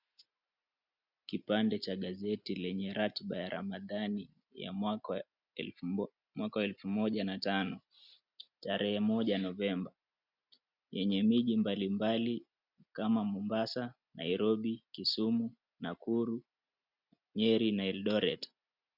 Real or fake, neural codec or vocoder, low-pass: real; none; 5.4 kHz